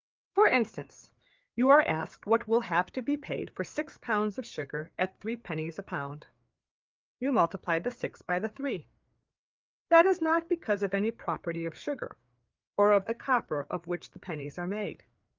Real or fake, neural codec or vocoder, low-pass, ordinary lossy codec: fake; codec, 16 kHz, 4 kbps, FreqCodec, larger model; 7.2 kHz; Opus, 32 kbps